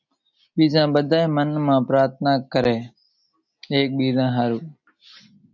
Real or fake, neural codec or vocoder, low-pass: real; none; 7.2 kHz